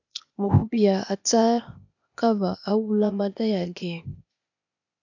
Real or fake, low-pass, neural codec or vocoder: fake; 7.2 kHz; codec, 16 kHz, 0.8 kbps, ZipCodec